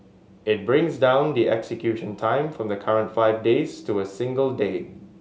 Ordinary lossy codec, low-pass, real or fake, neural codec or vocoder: none; none; real; none